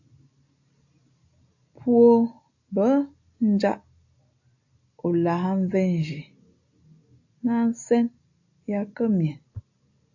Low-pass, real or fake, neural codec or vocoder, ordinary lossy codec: 7.2 kHz; real; none; AAC, 48 kbps